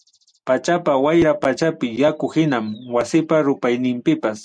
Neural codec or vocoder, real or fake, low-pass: none; real; 9.9 kHz